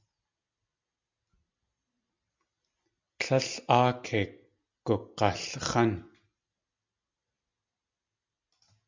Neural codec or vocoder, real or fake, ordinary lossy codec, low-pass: none; real; MP3, 64 kbps; 7.2 kHz